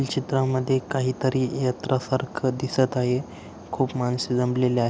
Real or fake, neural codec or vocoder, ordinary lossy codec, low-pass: real; none; none; none